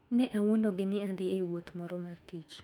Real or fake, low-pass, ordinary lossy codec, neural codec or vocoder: fake; 19.8 kHz; none; autoencoder, 48 kHz, 32 numbers a frame, DAC-VAE, trained on Japanese speech